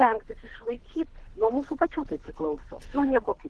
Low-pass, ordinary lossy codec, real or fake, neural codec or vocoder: 10.8 kHz; Opus, 16 kbps; fake; codec, 24 kHz, 3 kbps, HILCodec